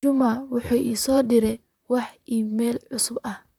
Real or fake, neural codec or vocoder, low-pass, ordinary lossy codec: fake; vocoder, 44.1 kHz, 128 mel bands, Pupu-Vocoder; 19.8 kHz; none